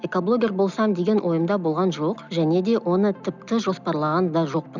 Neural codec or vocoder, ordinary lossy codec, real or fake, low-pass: none; none; real; 7.2 kHz